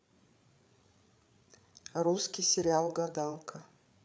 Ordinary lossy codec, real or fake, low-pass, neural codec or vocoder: none; fake; none; codec, 16 kHz, 8 kbps, FreqCodec, larger model